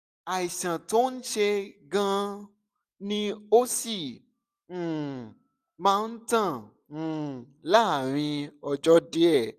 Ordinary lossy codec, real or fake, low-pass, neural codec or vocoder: none; real; 14.4 kHz; none